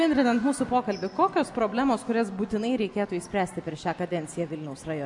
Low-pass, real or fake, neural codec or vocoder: 10.8 kHz; real; none